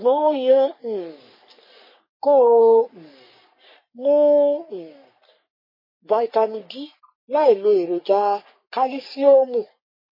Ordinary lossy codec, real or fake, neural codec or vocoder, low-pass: MP3, 24 kbps; fake; codec, 44.1 kHz, 3.4 kbps, Pupu-Codec; 5.4 kHz